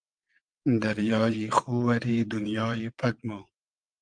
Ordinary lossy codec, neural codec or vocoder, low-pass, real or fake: Opus, 32 kbps; vocoder, 22.05 kHz, 80 mel bands, WaveNeXt; 9.9 kHz; fake